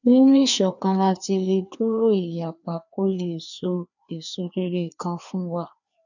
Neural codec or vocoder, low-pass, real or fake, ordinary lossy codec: codec, 16 kHz, 2 kbps, FreqCodec, larger model; 7.2 kHz; fake; none